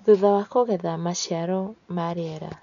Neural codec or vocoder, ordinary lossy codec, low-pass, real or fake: none; none; 7.2 kHz; real